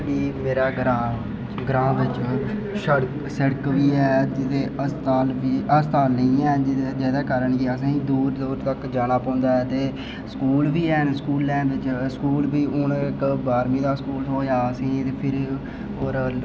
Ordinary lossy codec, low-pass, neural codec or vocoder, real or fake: none; none; none; real